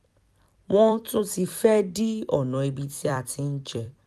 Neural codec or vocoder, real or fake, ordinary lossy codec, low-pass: vocoder, 44.1 kHz, 128 mel bands every 256 samples, BigVGAN v2; fake; none; 14.4 kHz